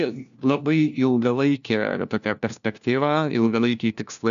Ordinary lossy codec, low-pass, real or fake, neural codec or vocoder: MP3, 96 kbps; 7.2 kHz; fake; codec, 16 kHz, 1 kbps, FunCodec, trained on LibriTTS, 50 frames a second